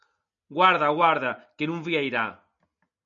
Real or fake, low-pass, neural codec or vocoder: real; 7.2 kHz; none